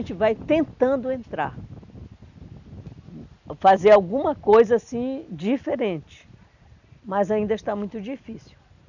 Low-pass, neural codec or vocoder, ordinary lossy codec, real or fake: 7.2 kHz; none; none; real